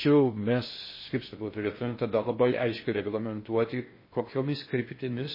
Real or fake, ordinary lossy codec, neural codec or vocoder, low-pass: fake; MP3, 24 kbps; codec, 16 kHz in and 24 kHz out, 0.6 kbps, FocalCodec, streaming, 4096 codes; 5.4 kHz